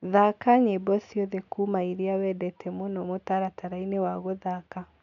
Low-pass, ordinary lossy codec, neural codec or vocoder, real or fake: 7.2 kHz; none; none; real